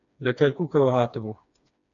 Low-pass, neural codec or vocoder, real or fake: 7.2 kHz; codec, 16 kHz, 2 kbps, FreqCodec, smaller model; fake